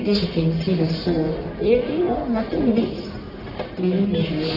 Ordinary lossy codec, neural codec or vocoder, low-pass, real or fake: none; codec, 44.1 kHz, 1.7 kbps, Pupu-Codec; 5.4 kHz; fake